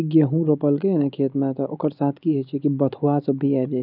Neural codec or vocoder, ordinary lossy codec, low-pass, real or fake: none; none; 5.4 kHz; real